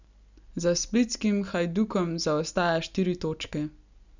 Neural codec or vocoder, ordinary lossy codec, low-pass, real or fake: none; none; 7.2 kHz; real